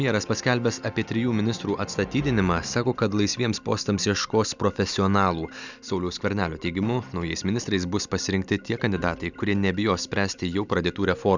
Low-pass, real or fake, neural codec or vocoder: 7.2 kHz; real; none